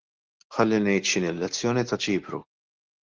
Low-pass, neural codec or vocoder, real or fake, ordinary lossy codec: 7.2 kHz; none; real; Opus, 16 kbps